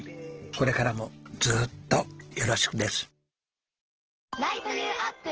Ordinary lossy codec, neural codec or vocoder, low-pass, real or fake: Opus, 16 kbps; none; 7.2 kHz; real